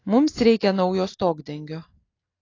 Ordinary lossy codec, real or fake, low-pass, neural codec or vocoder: AAC, 32 kbps; real; 7.2 kHz; none